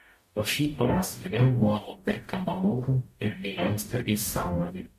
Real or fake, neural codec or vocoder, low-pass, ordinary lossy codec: fake; codec, 44.1 kHz, 0.9 kbps, DAC; 14.4 kHz; MP3, 64 kbps